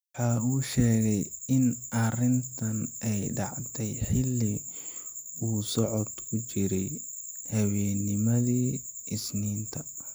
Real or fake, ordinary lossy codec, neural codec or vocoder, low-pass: real; none; none; none